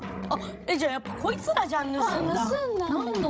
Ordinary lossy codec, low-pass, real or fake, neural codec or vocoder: none; none; fake; codec, 16 kHz, 16 kbps, FreqCodec, larger model